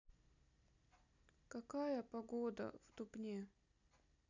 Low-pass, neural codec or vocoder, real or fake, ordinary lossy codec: 7.2 kHz; none; real; none